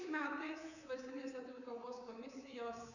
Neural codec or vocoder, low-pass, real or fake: codec, 24 kHz, 3.1 kbps, DualCodec; 7.2 kHz; fake